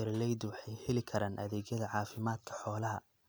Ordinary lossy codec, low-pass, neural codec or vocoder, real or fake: none; none; none; real